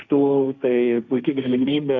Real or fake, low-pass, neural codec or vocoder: fake; 7.2 kHz; codec, 16 kHz, 1.1 kbps, Voila-Tokenizer